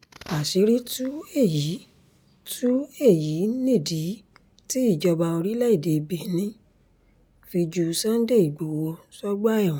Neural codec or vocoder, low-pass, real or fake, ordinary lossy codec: none; none; real; none